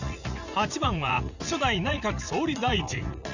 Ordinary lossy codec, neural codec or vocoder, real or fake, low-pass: none; vocoder, 44.1 kHz, 80 mel bands, Vocos; fake; 7.2 kHz